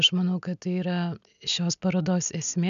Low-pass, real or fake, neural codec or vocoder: 7.2 kHz; real; none